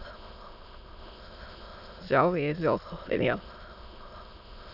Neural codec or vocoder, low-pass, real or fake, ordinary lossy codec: autoencoder, 22.05 kHz, a latent of 192 numbers a frame, VITS, trained on many speakers; 5.4 kHz; fake; none